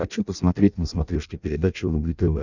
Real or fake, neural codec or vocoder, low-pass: fake; codec, 16 kHz in and 24 kHz out, 0.6 kbps, FireRedTTS-2 codec; 7.2 kHz